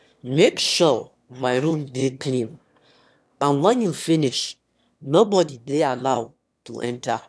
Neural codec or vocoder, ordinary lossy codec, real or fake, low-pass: autoencoder, 22.05 kHz, a latent of 192 numbers a frame, VITS, trained on one speaker; none; fake; none